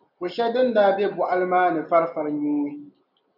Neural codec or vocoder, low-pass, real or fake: none; 5.4 kHz; real